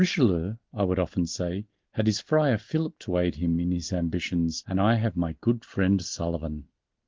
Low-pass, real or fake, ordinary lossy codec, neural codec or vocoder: 7.2 kHz; real; Opus, 16 kbps; none